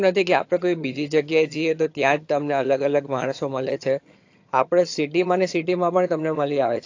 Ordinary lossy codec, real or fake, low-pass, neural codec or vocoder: AAC, 48 kbps; fake; 7.2 kHz; vocoder, 22.05 kHz, 80 mel bands, HiFi-GAN